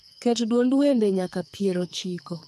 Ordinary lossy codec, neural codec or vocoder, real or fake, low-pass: none; codec, 44.1 kHz, 2.6 kbps, SNAC; fake; 14.4 kHz